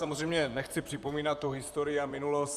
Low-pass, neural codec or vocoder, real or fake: 14.4 kHz; vocoder, 44.1 kHz, 128 mel bands, Pupu-Vocoder; fake